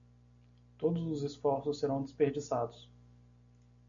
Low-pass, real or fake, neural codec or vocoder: 7.2 kHz; real; none